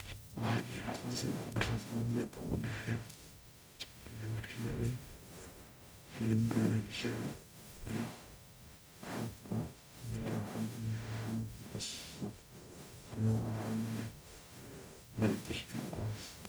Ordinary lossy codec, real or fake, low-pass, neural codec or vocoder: none; fake; none; codec, 44.1 kHz, 0.9 kbps, DAC